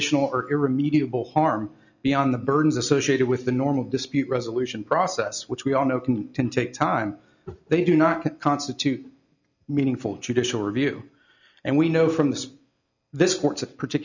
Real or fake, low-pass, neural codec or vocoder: real; 7.2 kHz; none